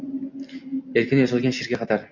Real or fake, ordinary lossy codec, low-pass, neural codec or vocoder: real; MP3, 48 kbps; 7.2 kHz; none